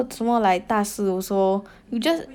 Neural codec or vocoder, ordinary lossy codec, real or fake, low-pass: none; none; real; 19.8 kHz